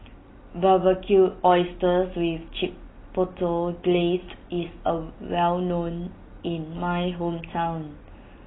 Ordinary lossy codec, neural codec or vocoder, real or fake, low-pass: AAC, 16 kbps; none; real; 7.2 kHz